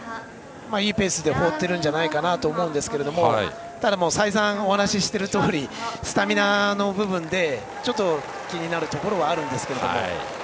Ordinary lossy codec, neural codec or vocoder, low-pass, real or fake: none; none; none; real